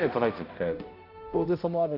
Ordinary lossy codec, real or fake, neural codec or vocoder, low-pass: none; fake; codec, 16 kHz, 0.5 kbps, X-Codec, HuBERT features, trained on balanced general audio; 5.4 kHz